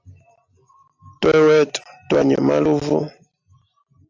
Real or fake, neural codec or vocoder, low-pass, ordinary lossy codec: real; none; 7.2 kHz; AAC, 48 kbps